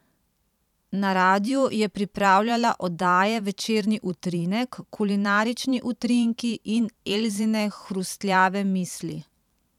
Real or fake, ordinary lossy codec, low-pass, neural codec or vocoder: fake; none; 19.8 kHz; vocoder, 44.1 kHz, 128 mel bands every 512 samples, BigVGAN v2